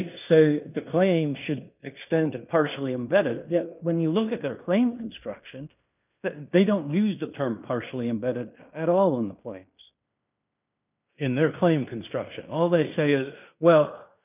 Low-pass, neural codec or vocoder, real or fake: 3.6 kHz; codec, 16 kHz in and 24 kHz out, 0.9 kbps, LongCat-Audio-Codec, fine tuned four codebook decoder; fake